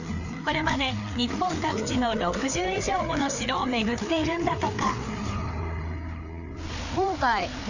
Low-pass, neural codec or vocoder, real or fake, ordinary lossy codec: 7.2 kHz; codec, 16 kHz, 4 kbps, FreqCodec, larger model; fake; none